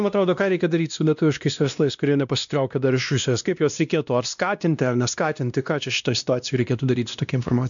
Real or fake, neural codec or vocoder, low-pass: fake; codec, 16 kHz, 1 kbps, X-Codec, WavLM features, trained on Multilingual LibriSpeech; 7.2 kHz